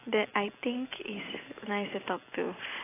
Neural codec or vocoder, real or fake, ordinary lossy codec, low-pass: none; real; AAC, 16 kbps; 3.6 kHz